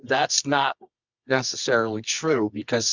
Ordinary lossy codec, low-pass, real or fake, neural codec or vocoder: Opus, 64 kbps; 7.2 kHz; fake; codec, 24 kHz, 0.9 kbps, WavTokenizer, medium music audio release